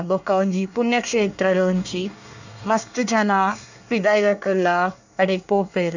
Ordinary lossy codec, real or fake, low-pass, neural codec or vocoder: none; fake; 7.2 kHz; codec, 24 kHz, 1 kbps, SNAC